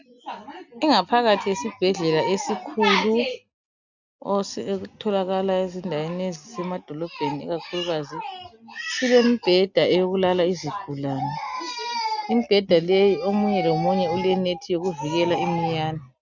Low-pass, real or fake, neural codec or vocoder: 7.2 kHz; real; none